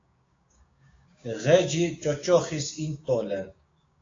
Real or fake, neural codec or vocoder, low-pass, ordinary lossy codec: fake; codec, 16 kHz, 6 kbps, DAC; 7.2 kHz; AAC, 32 kbps